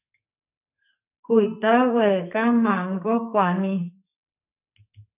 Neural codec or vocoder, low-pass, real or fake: codec, 44.1 kHz, 2.6 kbps, SNAC; 3.6 kHz; fake